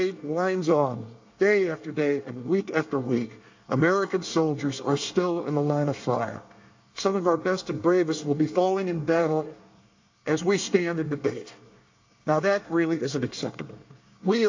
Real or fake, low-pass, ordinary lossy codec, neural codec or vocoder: fake; 7.2 kHz; AAC, 48 kbps; codec, 24 kHz, 1 kbps, SNAC